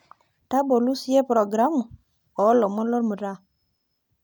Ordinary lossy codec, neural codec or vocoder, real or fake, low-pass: none; none; real; none